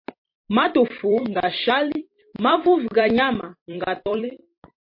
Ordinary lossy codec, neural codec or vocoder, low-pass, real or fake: MP3, 32 kbps; vocoder, 44.1 kHz, 128 mel bands every 256 samples, BigVGAN v2; 5.4 kHz; fake